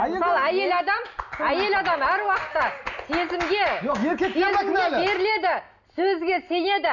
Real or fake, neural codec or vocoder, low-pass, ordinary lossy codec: real; none; 7.2 kHz; none